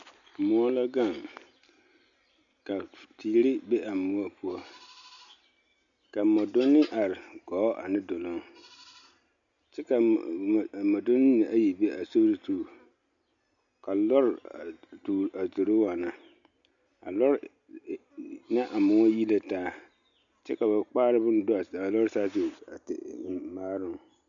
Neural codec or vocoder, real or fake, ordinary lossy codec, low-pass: none; real; MP3, 96 kbps; 7.2 kHz